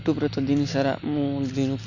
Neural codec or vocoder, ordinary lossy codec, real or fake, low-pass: none; none; real; 7.2 kHz